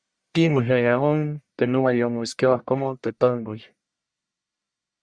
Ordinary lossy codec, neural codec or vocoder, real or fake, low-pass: Opus, 64 kbps; codec, 44.1 kHz, 1.7 kbps, Pupu-Codec; fake; 9.9 kHz